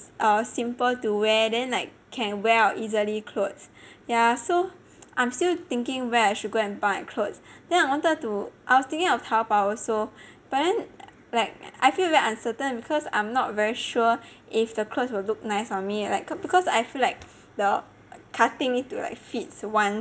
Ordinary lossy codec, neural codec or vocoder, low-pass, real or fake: none; none; none; real